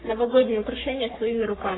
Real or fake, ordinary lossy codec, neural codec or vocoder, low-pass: fake; AAC, 16 kbps; codec, 44.1 kHz, 3.4 kbps, Pupu-Codec; 7.2 kHz